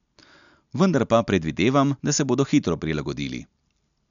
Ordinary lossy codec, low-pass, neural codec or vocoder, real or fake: none; 7.2 kHz; none; real